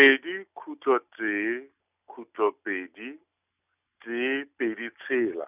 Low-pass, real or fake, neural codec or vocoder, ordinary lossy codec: 3.6 kHz; real; none; none